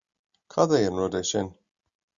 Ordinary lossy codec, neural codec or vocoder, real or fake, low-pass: Opus, 64 kbps; none; real; 7.2 kHz